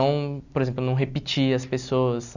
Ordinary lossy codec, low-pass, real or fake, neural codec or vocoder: none; 7.2 kHz; real; none